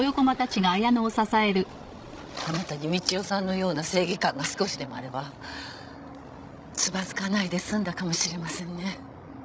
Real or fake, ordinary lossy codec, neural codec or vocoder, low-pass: fake; none; codec, 16 kHz, 16 kbps, FreqCodec, larger model; none